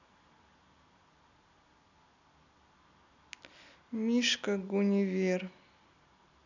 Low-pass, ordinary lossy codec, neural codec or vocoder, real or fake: 7.2 kHz; none; none; real